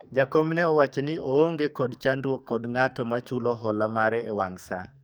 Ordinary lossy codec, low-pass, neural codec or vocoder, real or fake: none; none; codec, 44.1 kHz, 2.6 kbps, SNAC; fake